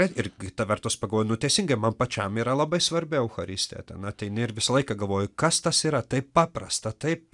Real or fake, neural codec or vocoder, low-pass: real; none; 10.8 kHz